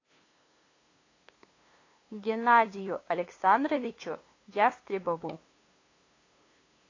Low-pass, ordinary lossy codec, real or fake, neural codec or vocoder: 7.2 kHz; AAC, 32 kbps; fake; codec, 16 kHz, 2 kbps, FunCodec, trained on LibriTTS, 25 frames a second